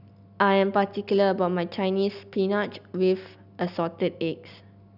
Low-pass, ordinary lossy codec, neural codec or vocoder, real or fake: 5.4 kHz; none; none; real